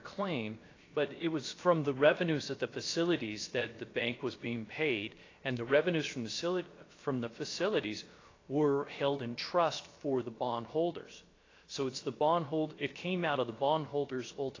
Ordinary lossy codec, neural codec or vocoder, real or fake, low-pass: AAC, 32 kbps; codec, 16 kHz, about 1 kbps, DyCAST, with the encoder's durations; fake; 7.2 kHz